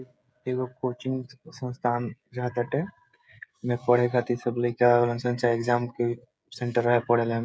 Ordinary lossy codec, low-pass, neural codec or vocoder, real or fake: none; none; codec, 16 kHz, 16 kbps, FreqCodec, smaller model; fake